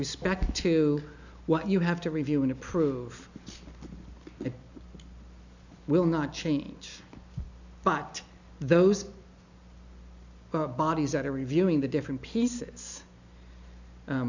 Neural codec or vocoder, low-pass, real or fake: none; 7.2 kHz; real